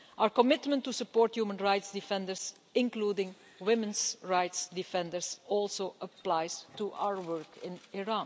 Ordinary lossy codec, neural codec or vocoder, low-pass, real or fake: none; none; none; real